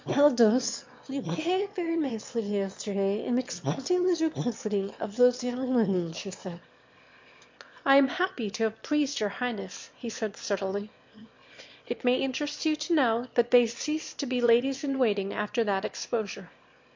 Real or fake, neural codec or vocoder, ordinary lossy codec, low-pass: fake; autoencoder, 22.05 kHz, a latent of 192 numbers a frame, VITS, trained on one speaker; MP3, 48 kbps; 7.2 kHz